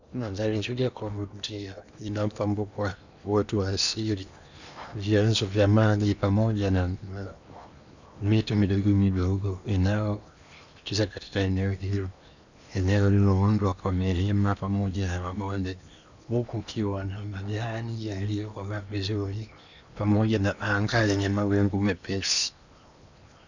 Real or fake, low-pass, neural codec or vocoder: fake; 7.2 kHz; codec, 16 kHz in and 24 kHz out, 0.8 kbps, FocalCodec, streaming, 65536 codes